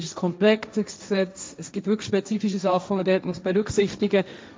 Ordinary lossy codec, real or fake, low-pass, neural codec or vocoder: none; fake; none; codec, 16 kHz, 1.1 kbps, Voila-Tokenizer